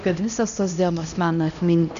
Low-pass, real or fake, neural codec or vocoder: 7.2 kHz; fake; codec, 16 kHz, 1 kbps, X-Codec, HuBERT features, trained on LibriSpeech